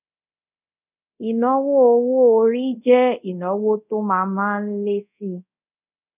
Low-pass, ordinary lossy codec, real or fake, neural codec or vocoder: 3.6 kHz; none; fake; codec, 24 kHz, 0.5 kbps, DualCodec